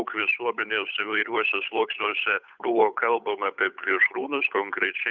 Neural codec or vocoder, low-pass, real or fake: codec, 16 kHz, 8 kbps, FunCodec, trained on Chinese and English, 25 frames a second; 7.2 kHz; fake